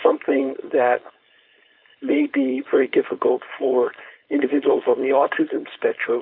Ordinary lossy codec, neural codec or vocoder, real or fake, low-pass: AAC, 48 kbps; codec, 16 kHz, 4.8 kbps, FACodec; fake; 5.4 kHz